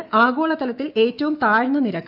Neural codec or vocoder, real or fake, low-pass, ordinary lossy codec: autoencoder, 48 kHz, 128 numbers a frame, DAC-VAE, trained on Japanese speech; fake; 5.4 kHz; none